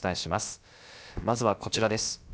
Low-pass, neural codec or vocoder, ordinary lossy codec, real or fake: none; codec, 16 kHz, about 1 kbps, DyCAST, with the encoder's durations; none; fake